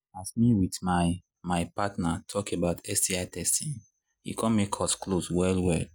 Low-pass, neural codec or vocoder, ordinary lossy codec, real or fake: none; none; none; real